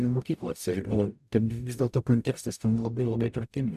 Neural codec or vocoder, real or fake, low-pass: codec, 44.1 kHz, 0.9 kbps, DAC; fake; 14.4 kHz